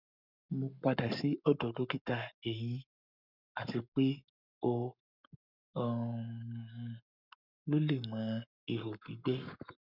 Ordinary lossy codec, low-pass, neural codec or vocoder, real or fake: none; 5.4 kHz; codec, 44.1 kHz, 7.8 kbps, Pupu-Codec; fake